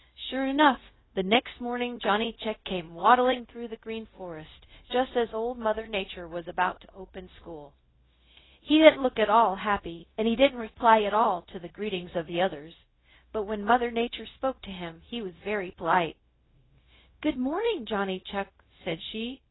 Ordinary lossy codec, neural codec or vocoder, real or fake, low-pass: AAC, 16 kbps; codec, 16 kHz, 0.4 kbps, LongCat-Audio-Codec; fake; 7.2 kHz